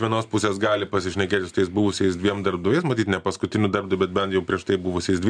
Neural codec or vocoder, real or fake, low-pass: none; real; 9.9 kHz